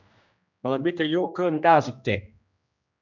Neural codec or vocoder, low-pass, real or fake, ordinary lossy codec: codec, 16 kHz, 1 kbps, X-Codec, HuBERT features, trained on general audio; 7.2 kHz; fake; none